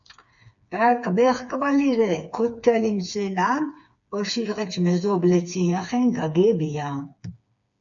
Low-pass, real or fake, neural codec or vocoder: 7.2 kHz; fake; codec, 16 kHz, 4 kbps, FreqCodec, smaller model